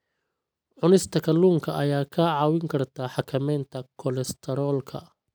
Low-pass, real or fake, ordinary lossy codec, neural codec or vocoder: none; real; none; none